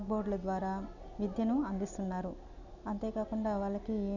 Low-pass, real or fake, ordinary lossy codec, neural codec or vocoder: 7.2 kHz; real; none; none